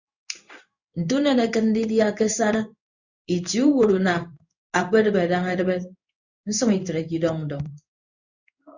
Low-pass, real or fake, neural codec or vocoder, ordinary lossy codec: 7.2 kHz; fake; codec, 16 kHz in and 24 kHz out, 1 kbps, XY-Tokenizer; Opus, 64 kbps